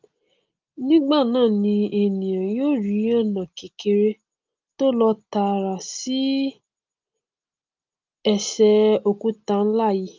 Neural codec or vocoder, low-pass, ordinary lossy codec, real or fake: none; 7.2 kHz; Opus, 24 kbps; real